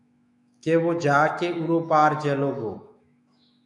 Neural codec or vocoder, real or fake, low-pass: autoencoder, 48 kHz, 128 numbers a frame, DAC-VAE, trained on Japanese speech; fake; 10.8 kHz